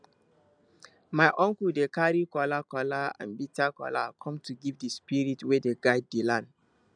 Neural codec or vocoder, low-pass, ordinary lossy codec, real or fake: none; 9.9 kHz; none; real